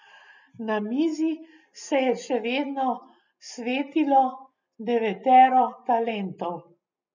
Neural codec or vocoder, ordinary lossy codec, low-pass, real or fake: none; none; 7.2 kHz; real